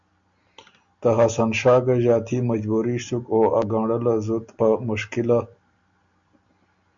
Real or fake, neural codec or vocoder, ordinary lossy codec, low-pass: real; none; MP3, 64 kbps; 7.2 kHz